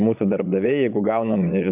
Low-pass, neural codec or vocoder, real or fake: 3.6 kHz; vocoder, 22.05 kHz, 80 mel bands, Vocos; fake